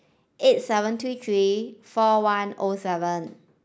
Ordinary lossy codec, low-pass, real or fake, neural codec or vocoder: none; none; real; none